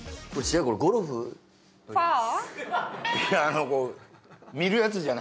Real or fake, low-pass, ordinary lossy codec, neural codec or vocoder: real; none; none; none